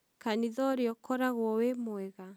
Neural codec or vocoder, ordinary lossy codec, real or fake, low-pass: none; none; real; none